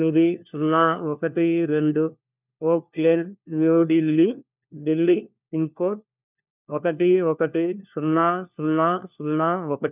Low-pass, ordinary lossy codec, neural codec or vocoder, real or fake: 3.6 kHz; none; codec, 16 kHz, 1 kbps, FunCodec, trained on LibriTTS, 50 frames a second; fake